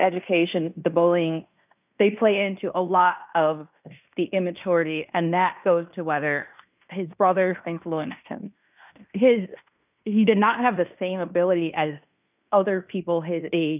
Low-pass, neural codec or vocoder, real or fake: 3.6 kHz; codec, 16 kHz in and 24 kHz out, 0.9 kbps, LongCat-Audio-Codec, fine tuned four codebook decoder; fake